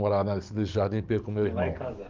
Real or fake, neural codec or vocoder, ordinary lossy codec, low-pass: fake; codec, 44.1 kHz, 7.8 kbps, DAC; Opus, 24 kbps; 7.2 kHz